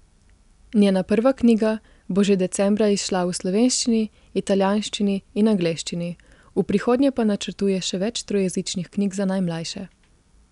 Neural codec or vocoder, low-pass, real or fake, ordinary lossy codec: none; 10.8 kHz; real; none